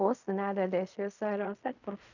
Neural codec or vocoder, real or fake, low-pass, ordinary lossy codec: codec, 16 kHz in and 24 kHz out, 0.4 kbps, LongCat-Audio-Codec, fine tuned four codebook decoder; fake; 7.2 kHz; none